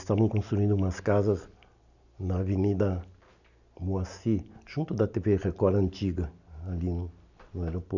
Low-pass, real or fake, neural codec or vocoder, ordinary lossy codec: 7.2 kHz; real; none; none